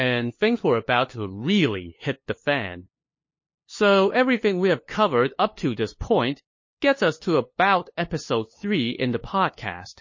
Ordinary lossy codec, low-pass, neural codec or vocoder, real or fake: MP3, 32 kbps; 7.2 kHz; codec, 16 kHz, 2 kbps, FunCodec, trained on LibriTTS, 25 frames a second; fake